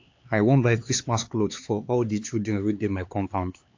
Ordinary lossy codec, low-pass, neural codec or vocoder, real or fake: AAC, 48 kbps; 7.2 kHz; codec, 16 kHz, 4 kbps, X-Codec, HuBERT features, trained on LibriSpeech; fake